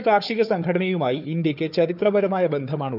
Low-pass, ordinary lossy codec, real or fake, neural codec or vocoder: 5.4 kHz; none; fake; codec, 16 kHz, 4 kbps, FunCodec, trained on Chinese and English, 50 frames a second